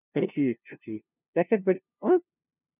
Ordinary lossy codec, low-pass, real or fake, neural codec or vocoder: none; 3.6 kHz; fake; codec, 16 kHz, 0.5 kbps, FunCodec, trained on LibriTTS, 25 frames a second